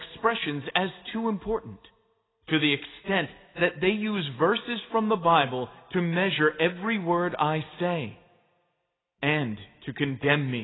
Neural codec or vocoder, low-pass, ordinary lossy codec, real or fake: none; 7.2 kHz; AAC, 16 kbps; real